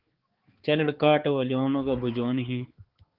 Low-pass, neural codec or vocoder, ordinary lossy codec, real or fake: 5.4 kHz; codec, 16 kHz, 4 kbps, X-Codec, HuBERT features, trained on balanced general audio; Opus, 16 kbps; fake